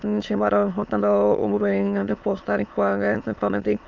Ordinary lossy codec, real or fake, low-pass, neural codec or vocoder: Opus, 24 kbps; fake; 7.2 kHz; autoencoder, 22.05 kHz, a latent of 192 numbers a frame, VITS, trained on many speakers